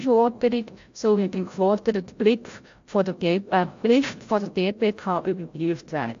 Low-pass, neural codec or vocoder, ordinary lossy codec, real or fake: 7.2 kHz; codec, 16 kHz, 0.5 kbps, FreqCodec, larger model; AAC, 48 kbps; fake